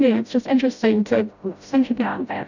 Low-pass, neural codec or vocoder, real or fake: 7.2 kHz; codec, 16 kHz, 0.5 kbps, FreqCodec, smaller model; fake